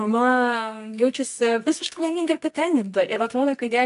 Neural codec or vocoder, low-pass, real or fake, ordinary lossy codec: codec, 24 kHz, 0.9 kbps, WavTokenizer, medium music audio release; 10.8 kHz; fake; AAC, 64 kbps